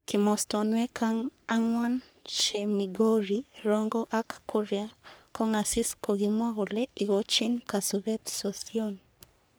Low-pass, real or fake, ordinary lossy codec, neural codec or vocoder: none; fake; none; codec, 44.1 kHz, 3.4 kbps, Pupu-Codec